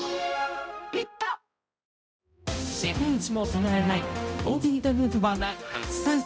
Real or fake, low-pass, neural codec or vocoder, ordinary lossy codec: fake; none; codec, 16 kHz, 0.5 kbps, X-Codec, HuBERT features, trained on balanced general audio; none